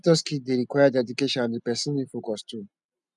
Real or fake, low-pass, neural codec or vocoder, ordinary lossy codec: real; 10.8 kHz; none; none